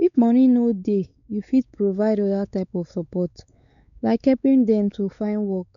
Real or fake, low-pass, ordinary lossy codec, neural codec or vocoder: fake; 7.2 kHz; none; codec, 16 kHz, 4 kbps, X-Codec, WavLM features, trained on Multilingual LibriSpeech